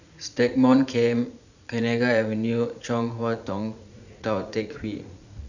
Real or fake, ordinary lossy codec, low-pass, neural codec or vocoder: fake; none; 7.2 kHz; vocoder, 44.1 kHz, 80 mel bands, Vocos